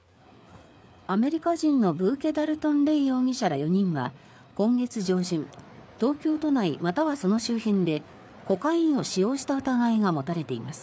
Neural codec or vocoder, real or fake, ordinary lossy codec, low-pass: codec, 16 kHz, 4 kbps, FreqCodec, larger model; fake; none; none